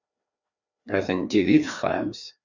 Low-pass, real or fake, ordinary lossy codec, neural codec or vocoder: 7.2 kHz; fake; Opus, 64 kbps; codec, 16 kHz, 2 kbps, FreqCodec, larger model